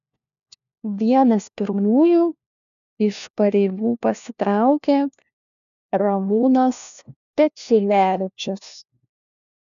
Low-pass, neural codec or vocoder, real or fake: 7.2 kHz; codec, 16 kHz, 1 kbps, FunCodec, trained on LibriTTS, 50 frames a second; fake